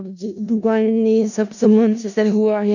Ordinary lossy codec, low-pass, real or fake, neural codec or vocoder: none; 7.2 kHz; fake; codec, 16 kHz in and 24 kHz out, 0.4 kbps, LongCat-Audio-Codec, four codebook decoder